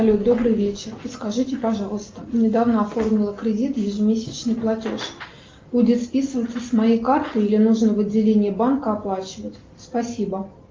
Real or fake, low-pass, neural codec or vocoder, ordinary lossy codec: real; 7.2 kHz; none; Opus, 32 kbps